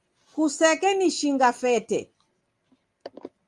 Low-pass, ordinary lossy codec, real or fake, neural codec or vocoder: 10.8 kHz; Opus, 32 kbps; real; none